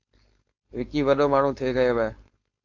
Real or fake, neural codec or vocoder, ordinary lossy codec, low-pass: fake; codec, 16 kHz, 4.8 kbps, FACodec; AAC, 48 kbps; 7.2 kHz